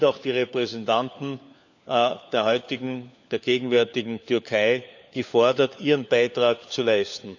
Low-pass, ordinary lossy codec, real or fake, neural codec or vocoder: 7.2 kHz; none; fake; codec, 16 kHz, 4 kbps, FunCodec, trained on LibriTTS, 50 frames a second